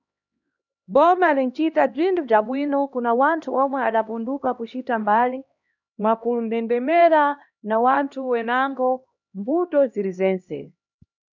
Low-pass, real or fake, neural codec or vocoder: 7.2 kHz; fake; codec, 16 kHz, 1 kbps, X-Codec, HuBERT features, trained on LibriSpeech